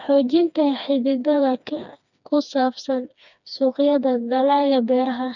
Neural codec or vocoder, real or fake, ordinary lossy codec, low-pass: codec, 16 kHz, 2 kbps, FreqCodec, smaller model; fake; none; 7.2 kHz